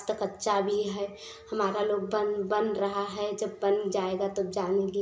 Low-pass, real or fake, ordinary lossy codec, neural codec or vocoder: none; real; none; none